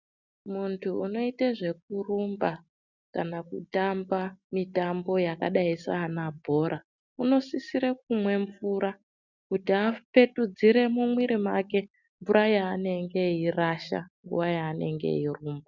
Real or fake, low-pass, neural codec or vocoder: real; 7.2 kHz; none